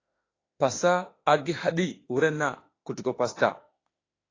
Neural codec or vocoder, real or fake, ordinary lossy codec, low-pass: autoencoder, 48 kHz, 32 numbers a frame, DAC-VAE, trained on Japanese speech; fake; AAC, 32 kbps; 7.2 kHz